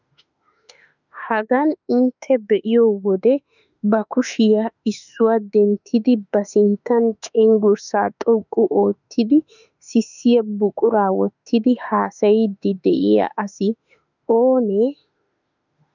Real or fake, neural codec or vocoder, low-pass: fake; autoencoder, 48 kHz, 32 numbers a frame, DAC-VAE, trained on Japanese speech; 7.2 kHz